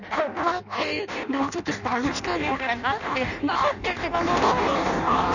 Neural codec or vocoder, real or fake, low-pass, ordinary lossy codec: codec, 16 kHz in and 24 kHz out, 0.6 kbps, FireRedTTS-2 codec; fake; 7.2 kHz; none